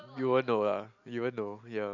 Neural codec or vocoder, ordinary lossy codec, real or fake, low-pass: none; none; real; 7.2 kHz